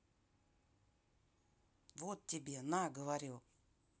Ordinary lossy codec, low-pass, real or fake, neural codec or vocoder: none; none; real; none